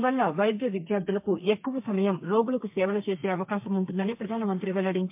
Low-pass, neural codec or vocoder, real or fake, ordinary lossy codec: 3.6 kHz; codec, 32 kHz, 1.9 kbps, SNAC; fake; MP3, 32 kbps